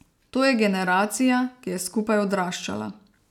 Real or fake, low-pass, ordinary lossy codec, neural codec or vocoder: real; 19.8 kHz; none; none